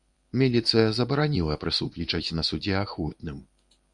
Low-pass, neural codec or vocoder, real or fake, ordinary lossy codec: 10.8 kHz; codec, 24 kHz, 0.9 kbps, WavTokenizer, medium speech release version 1; fake; Opus, 64 kbps